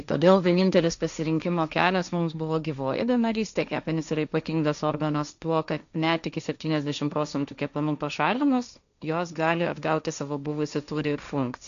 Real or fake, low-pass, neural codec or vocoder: fake; 7.2 kHz; codec, 16 kHz, 1.1 kbps, Voila-Tokenizer